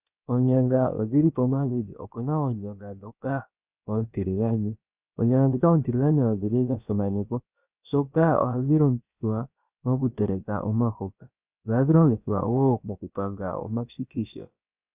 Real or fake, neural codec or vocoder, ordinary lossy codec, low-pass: fake; codec, 16 kHz, about 1 kbps, DyCAST, with the encoder's durations; Opus, 64 kbps; 3.6 kHz